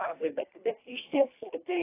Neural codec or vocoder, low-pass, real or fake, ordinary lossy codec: codec, 24 kHz, 1.5 kbps, HILCodec; 3.6 kHz; fake; AAC, 24 kbps